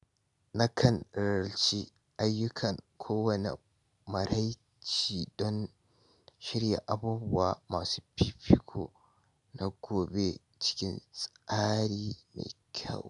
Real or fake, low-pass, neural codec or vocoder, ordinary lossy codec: real; 10.8 kHz; none; none